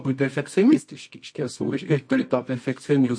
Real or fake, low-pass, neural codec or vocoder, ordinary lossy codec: fake; 9.9 kHz; codec, 24 kHz, 0.9 kbps, WavTokenizer, medium music audio release; MP3, 48 kbps